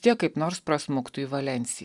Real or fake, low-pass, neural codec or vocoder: real; 10.8 kHz; none